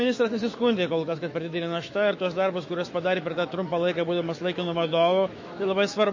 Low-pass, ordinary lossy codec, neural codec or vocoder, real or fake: 7.2 kHz; MP3, 32 kbps; autoencoder, 48 kHz, 128 numbers a frame, DAC-VAE, trained on Japanese speech; fake